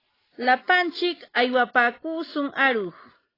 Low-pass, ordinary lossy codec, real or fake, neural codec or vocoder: 5.4 kHz; AAC, 24 kbps; real; none